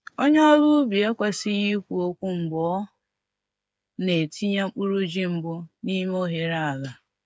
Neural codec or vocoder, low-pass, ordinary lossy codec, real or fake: codec, 16 kHz, 8 kbps, FreqCodec, smaller model; none; none; fake